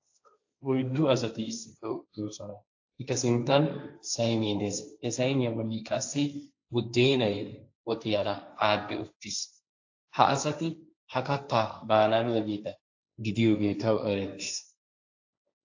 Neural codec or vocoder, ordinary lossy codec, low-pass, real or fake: codec, 16 kHz, 1.1 kbps, Voila-Tokenizer; AAC, 48 kbps; 7.2 kHz; fake